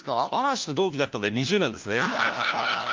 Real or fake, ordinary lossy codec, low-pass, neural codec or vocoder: fake; Opus, 32 kbps; 7.2 kHz; codec, 16 kHz, 1 kbps, FunCodec, trained on LibriTTS, 50 frames a second